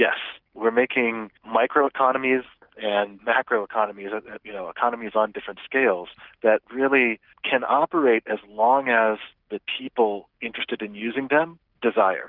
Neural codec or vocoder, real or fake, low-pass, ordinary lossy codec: none; real; 5.4 kHz; Opus, 24 kbps